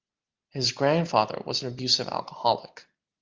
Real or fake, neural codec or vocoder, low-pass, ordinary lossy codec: real; none; 7.2 kHz; Opus, 32 kbps